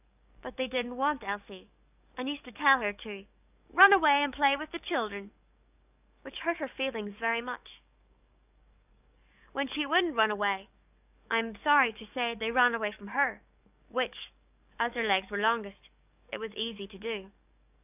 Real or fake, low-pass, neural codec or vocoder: fake; 3.6 kHz; codec, 44.1 kHz, 7.8 kbps, DAC